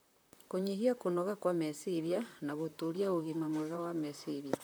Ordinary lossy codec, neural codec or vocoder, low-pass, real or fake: none; vocoder, 44.1 kHz, 128 mel bands, Pupu-Vocoder; none; fake